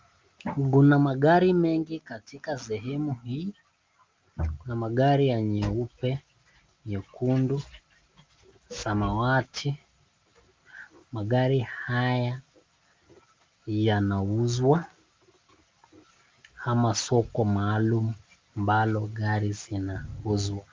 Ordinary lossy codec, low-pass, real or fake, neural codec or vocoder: Opus, 24 kbps; 7.2 kHz; real; none